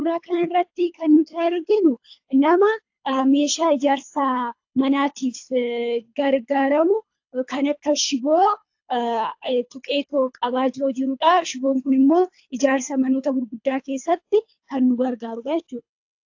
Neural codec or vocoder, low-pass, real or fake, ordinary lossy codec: codec, 24 kHz, 3 kbps, HILCodec; 7.2 kHz; fake; AAC, 48 kbps